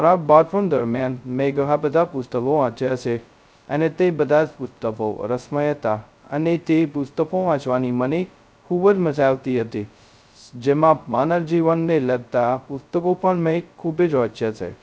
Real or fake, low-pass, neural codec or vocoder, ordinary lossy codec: fake; none; codec, 16 kHz, 0.2 kbps, FocalCodec; none